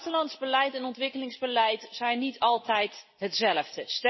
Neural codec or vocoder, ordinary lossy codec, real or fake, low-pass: none; MP3, 24 kbps; real; 7.2 kHz